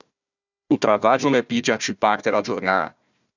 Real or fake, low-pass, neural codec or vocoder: fake; 7.2 kHz; codec, 16 kHz, 1 kbps, FunCodec, trained on Chinese and English, 50 frames a second